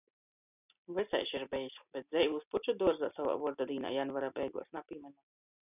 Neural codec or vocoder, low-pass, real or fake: none; 3.6 kHz; real